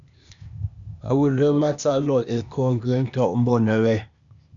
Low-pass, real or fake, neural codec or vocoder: 7.2 kHz; fake; codec, 16 kHz, 0.8 kbps, ZipCodec